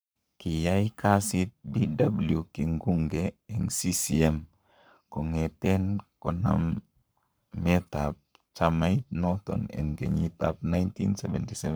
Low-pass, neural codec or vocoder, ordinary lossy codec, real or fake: none; codec, 44.1 kHz, 7.8 kbps, Pupu-Codec; none; fake